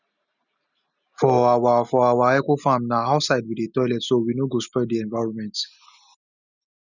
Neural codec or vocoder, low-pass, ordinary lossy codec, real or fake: none; 7.2 kHz; none; real